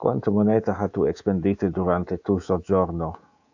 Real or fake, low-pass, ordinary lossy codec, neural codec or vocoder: fake; 7.2 kHz; AAC, 48 kbps; codec, 24 kHz, 3.1 kbps, DualCodec